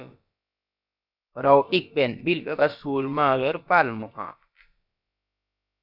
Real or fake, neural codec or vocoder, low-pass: fake; codec, 16 kHz, about 1 kbps, DyCAST, with the encoder's durations; 5.4 kHz